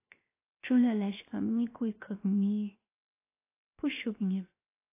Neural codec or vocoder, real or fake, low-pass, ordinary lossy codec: codec, 16 kHz, 0.7 kbps, FocalCodec; fake; 3.6 kHz; AAC, 24 kbps